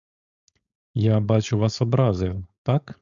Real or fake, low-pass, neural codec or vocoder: fake; 7.2 kHz; codec, 16 kHz, 4.8 kbps, FACodec